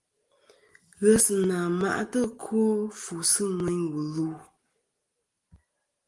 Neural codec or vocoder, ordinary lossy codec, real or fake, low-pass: none; Opus, 24 kbps; real; 10.8 kHz